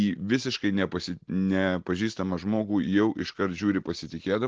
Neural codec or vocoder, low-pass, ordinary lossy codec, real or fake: none; 7.2 kHz; Opus, 16 kbps; real